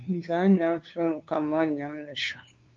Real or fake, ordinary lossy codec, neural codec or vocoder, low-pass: fake; Opus, 32 kbps; codec, 16 kHz, 2 kbps, FunCodec, trained on LibriTTS, 25 frames a second; 7.2 kHz